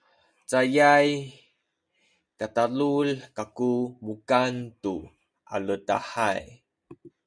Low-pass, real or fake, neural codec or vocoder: 9.9 kHz; real; none